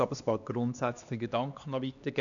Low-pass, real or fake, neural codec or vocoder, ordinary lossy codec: 7.2 kHz; fake; codec, 16 kHz, 2 kbps, X-Codec, HuBERT features, trained on LibriSpeech; none